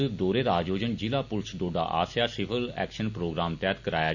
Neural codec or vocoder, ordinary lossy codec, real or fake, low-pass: none; none; real; 7.2 kHz